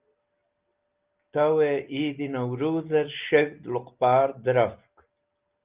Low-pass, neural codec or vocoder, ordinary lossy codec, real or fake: 3.6 kHz; none; Opus, 24 kbps; real